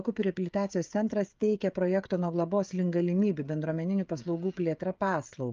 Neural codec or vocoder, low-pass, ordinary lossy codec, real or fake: codec, 16 kHz, 16 kbps, FreqCodec, smaller model; 7.2 kHz; Opus, 24 kbps; fake